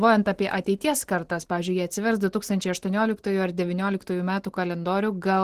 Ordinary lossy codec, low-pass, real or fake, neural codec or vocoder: Opus, 16 kbps; 14.4 kHz; real; none